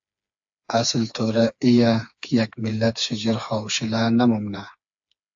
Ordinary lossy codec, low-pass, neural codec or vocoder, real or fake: AAC, 64 kbps; 7.2 kHz; codec, 16 kHz, 4 kbps, FreqCodec, smaller model; fake